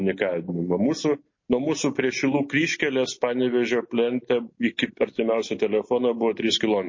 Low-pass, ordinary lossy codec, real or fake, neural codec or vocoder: 7.2 kHz; MP3, 32 kbps; real; none